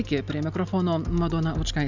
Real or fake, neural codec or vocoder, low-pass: real; none; 7.2 kHz